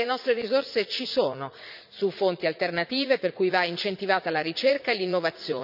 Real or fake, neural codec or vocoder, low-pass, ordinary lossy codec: fake; vocoder, 44.1 kHz, 80 mel bands, Vocos; 5.4 kHz; none